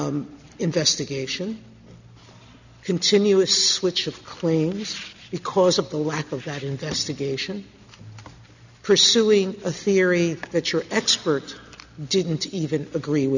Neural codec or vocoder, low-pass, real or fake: none; 7.2 kHz; real